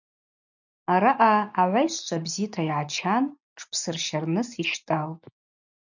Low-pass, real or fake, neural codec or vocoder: 7.2 kHz; real; none